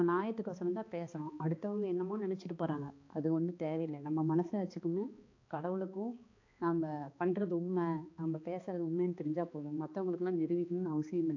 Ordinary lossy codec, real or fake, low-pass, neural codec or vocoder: AAC, 48 kbps; fake; 7.2 kHz; codec, 16 kHz, 2 kbps, X-Codec, HuBERT features, trained on balanced general audio